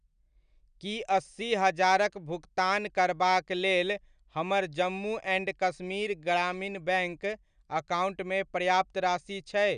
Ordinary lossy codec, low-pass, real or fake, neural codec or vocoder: none; 9.9 kHz; real; none